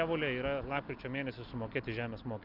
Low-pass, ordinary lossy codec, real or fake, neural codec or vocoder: 5.4 kHz; Opus, 24 kbps; real; none